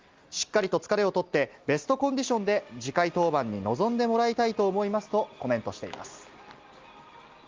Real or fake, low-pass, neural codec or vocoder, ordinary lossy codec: real; 7.2 kHz; none; Opus, 32 kbps